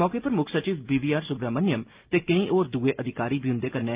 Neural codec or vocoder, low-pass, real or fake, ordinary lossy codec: none; 3.6 kHz; real; Opus, 24 kbps